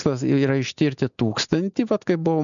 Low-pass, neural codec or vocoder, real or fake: 7.2 kHz; none; real